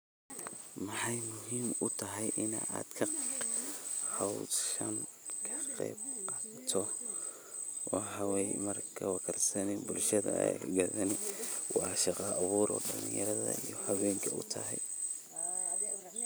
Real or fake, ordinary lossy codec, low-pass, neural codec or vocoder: real; none; none; none